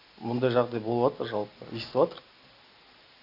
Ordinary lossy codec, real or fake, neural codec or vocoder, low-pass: none; real; none; 5.4 kHz